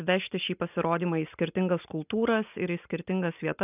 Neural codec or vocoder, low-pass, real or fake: none; 3.6 kHz; real